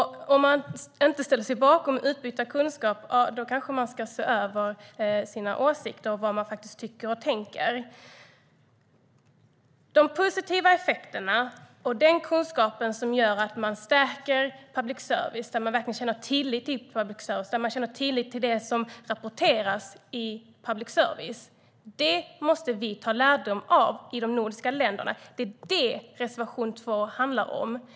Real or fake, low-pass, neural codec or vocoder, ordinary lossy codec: real; none; none; none